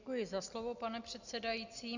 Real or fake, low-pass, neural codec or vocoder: real; 7.2 kHz; none